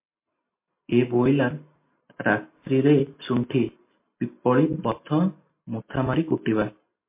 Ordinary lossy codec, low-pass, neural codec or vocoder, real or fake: MP3, 24 kbps; 3.6 kHz; none; real